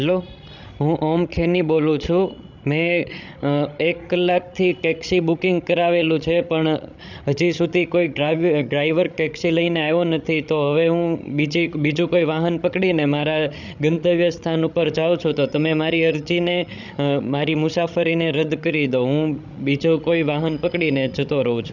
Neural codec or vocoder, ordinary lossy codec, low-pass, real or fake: codec, 16 kHz, 16 kbps, FreqCodec, larger model; none; 7.2 kHz; fake